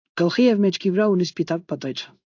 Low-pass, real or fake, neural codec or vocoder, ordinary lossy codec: 7.2 kHz; fake; codec, 16 kHz in and 24 kHz out, 1 kbps, XY-Tokenizer; AAC, 48 kbps